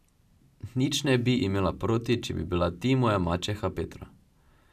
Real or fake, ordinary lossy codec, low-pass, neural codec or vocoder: real; none; 14.4 kHz; none